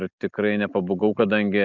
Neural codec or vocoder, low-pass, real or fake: none; 7.2 kHz; real